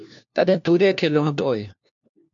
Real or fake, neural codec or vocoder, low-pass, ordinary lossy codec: fake; codec, 16 kHz, 1 kbps, FunCodec, trained on LibriTTS, 50 frames a second; 7.2 kHz; MP3, 64 kbps